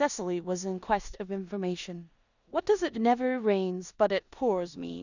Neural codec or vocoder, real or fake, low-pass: codec, 16 kHz in and 24 kHz out, 0.9 kbps, LongCat-Audio-Codec, four codebook decoder; fake; 7.2 kHz